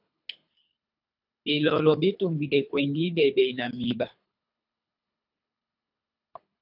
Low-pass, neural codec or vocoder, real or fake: 5.4 kHz; codec, 24 kHz, 3 kbps, HILCodec; fake